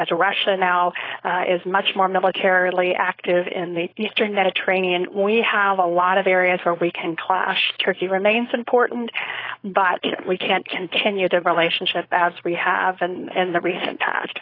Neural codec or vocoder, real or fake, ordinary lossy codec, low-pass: codec, 16 kHz, 4.8 kbps, FACodec; fake; AAC, 24 kbps; 5.4 kHz